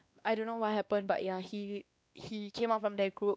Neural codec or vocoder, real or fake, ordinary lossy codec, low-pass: codec, 16 kHz, 2 kbps, X-Codec, WavLM features, trained on Multilingual LibriSpeech; fake; none; none